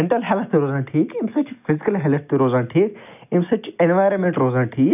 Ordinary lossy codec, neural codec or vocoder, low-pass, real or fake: none; none; 3.6 kHz; real